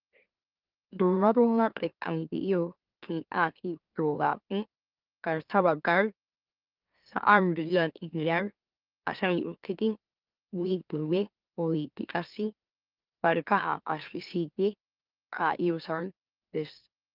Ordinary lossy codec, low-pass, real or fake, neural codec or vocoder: Opus, 24 kbps; 5.4 kHz; fake; autoencoder, 44.1 kHz, a latent of 192 numbers a frame, MeloTTS